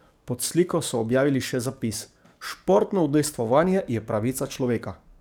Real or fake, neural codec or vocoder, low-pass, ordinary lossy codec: fake; codec, 44.1 kHz, 7.8 kbps, DAC; none; none